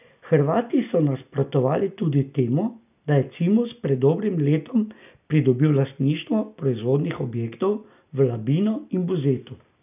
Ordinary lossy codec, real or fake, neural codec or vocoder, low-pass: none; real; none; 3.6 kHz